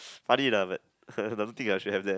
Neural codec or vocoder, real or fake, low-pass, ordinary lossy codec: none; real; none; none